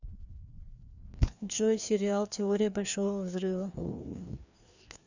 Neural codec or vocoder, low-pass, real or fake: codec, 16 kHz, 2 kbps, FreqCodec, larger model; 7.2 kHz; fake